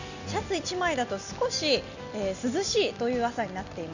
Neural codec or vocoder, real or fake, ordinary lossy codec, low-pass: none; real; none; 7.2 kHz